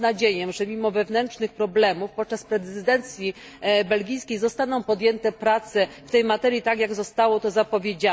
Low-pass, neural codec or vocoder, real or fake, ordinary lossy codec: none; none; real; none